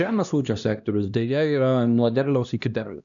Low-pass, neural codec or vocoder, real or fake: 7.2 kHz; codec, 16 kHz, 1 kbps, X-Codec, HuBERT features, trained on LibriSpeech; fake